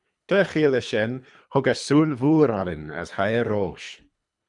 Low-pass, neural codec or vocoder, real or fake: 10.8 kHz; codec, 24 kHz, 3 kbps, HILCodec; fake